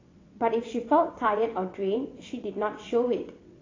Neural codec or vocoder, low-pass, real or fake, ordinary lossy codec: vocoder, 22.05 kHz, 80 mel bands, WaveNeXt; 7.2 kHz; fake; AAC, 32 kbps